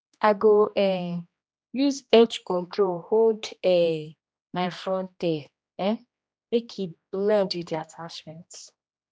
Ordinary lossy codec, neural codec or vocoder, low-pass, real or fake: none; codec, 16 kHz, 1 kbps, X-Codec, HuBERT features, trained on general audio; none; fake